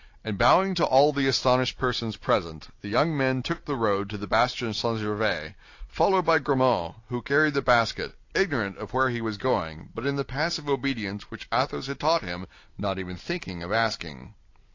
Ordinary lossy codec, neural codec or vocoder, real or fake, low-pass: AAC, 48 kbps; none; real; 7.2 kHz